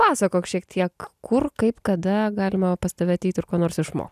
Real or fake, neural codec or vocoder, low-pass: real; none; 14.4 kHz